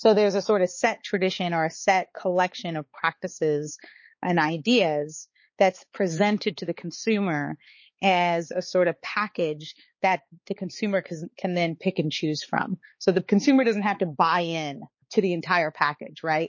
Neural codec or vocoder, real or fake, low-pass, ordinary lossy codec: codec, 16 kHz, 4 kbps, X-Codec, HuBERT features, trained on balanced general audio; fake; 7.2 kHz; MP3, 32 kbps